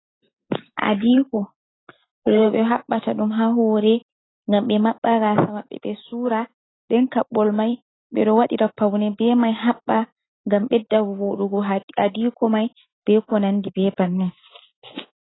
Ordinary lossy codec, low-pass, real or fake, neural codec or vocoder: AAC, 16 kbps; 7.2 kHz; real; none